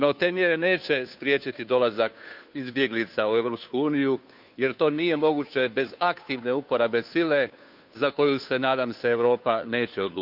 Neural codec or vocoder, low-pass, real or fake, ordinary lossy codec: codec, 16 kHz, 2 kbps, FunCodec, trained on Chinese and English, 25 frames a second; 5.4 kHz; fake; none